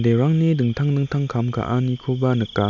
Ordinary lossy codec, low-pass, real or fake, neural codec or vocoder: none; 7.2 kHz; real; none